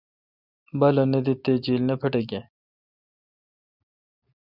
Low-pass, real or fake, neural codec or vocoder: 5.4 kHz; real; none